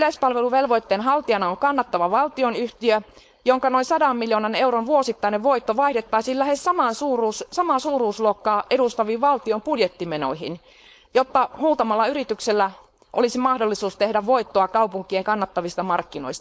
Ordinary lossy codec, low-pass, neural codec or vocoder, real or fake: none; none; codec, 16 kHz, 4.8 kbps, FACodec; fake